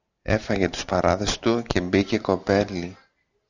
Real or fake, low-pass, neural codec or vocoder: real; 7.2 kHz; none